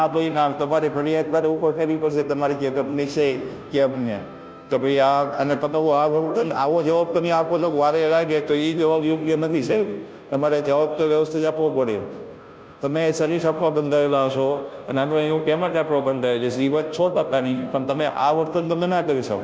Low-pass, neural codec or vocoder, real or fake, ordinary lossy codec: none; codec, 16 kHz, 0.5 kbps, FunCodec, trained on Chinese and English, 25 frames a second; fake; none